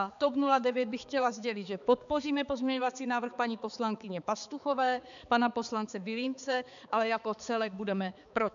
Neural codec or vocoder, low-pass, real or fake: codec, 16 kHz, 4 kbps, X-Codec, HuBERT features, trained on balanced general audio; 7.2 kHz; fake